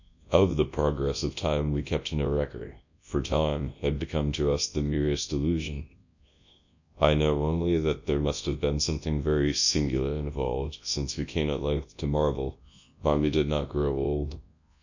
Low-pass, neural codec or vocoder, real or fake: 7.2 kHz; codec, 24 kHz, 0.9 kbps, WavTokenizer, large speech release; fake